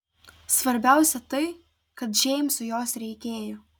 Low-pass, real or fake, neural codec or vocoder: 19.8 kHz; real; none